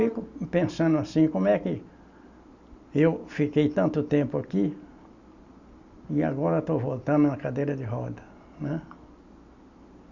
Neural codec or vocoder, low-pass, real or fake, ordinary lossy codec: none; 7.2 kHz; real; Opus, 64 kbps